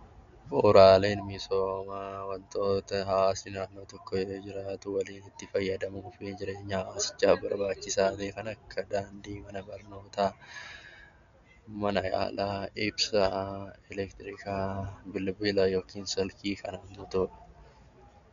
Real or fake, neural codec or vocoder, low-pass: real; none; 7.2 kHz